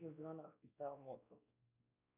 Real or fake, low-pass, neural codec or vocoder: fake; 3.6 kHz; codec, 16 kHz, 1 kbps, X-Codec, WavLM features, trained on Multilingual LibriSpeech